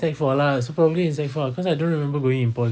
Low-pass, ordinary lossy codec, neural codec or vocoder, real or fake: none; none; none; real